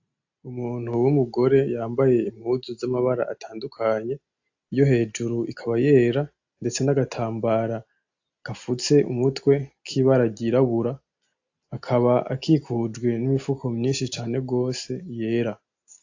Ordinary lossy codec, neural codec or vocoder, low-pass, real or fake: AAC, 48 kbps; none; 7.2 kHz; real